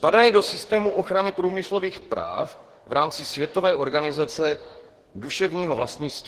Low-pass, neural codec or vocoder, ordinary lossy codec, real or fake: 14.4 kHz; codec, 44.1 kHz, 2.6 kbps, DAC; Opus, 16 kbps; fake